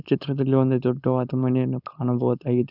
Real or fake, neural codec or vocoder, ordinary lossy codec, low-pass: fake; codec, 16 kHz, 4.8 kbps, FACodec; none; 5.4 kHz